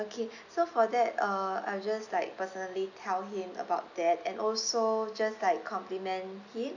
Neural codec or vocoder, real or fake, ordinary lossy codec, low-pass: none; real; none; 7.2 kHz